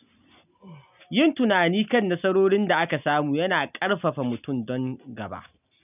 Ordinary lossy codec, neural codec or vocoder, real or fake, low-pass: none; none; real; 3.6 kHz